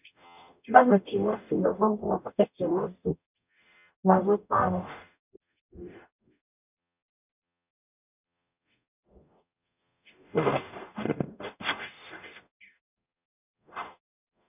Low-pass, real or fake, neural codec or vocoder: 3.6 kHz; fake; codec, 44.1 kHz, 0.9 kbps, DAC